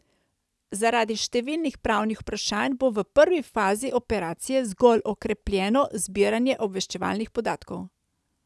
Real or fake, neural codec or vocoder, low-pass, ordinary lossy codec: real; none; none; none